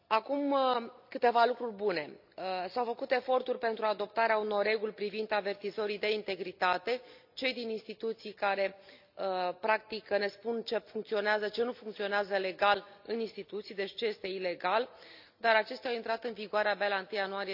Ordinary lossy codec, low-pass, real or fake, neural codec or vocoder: none; 5.4 kHz; real; none